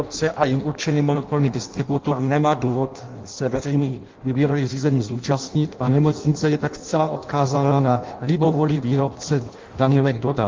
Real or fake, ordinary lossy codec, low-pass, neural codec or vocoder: fake; Opus, 32 kbps; 7.2 kHz; codec, 16 kHz in and 24 kHz out, 0.6 kbps, FireRedTTS-2 codec